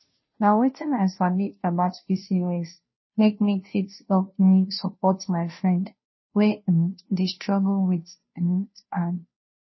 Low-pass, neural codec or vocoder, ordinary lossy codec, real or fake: 7.2 kHz; codec, 16 kHz, 0.5 kbps, FunCodec, trained on Chinese and English, 25 frames a second; MP3, 24 kbps; fake